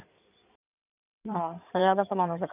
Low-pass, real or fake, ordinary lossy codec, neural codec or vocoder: 3.6 kHz; fake; none; codec, 16 kHz in and 24 kHz out, 2.2 kbps, FireRedTTS-2 codec